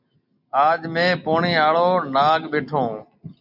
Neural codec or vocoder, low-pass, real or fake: none; 5.4 kHz; real